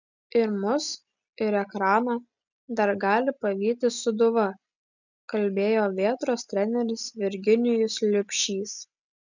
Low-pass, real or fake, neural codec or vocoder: 7.2 kHz; real; none